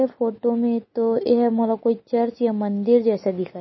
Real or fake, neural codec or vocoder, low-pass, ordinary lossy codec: real; none; 7.2 kHz; MP3, 24 kbps